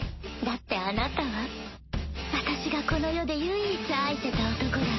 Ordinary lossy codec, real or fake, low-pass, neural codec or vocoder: MP3, 24 kbps; real; 7.2 kHz; none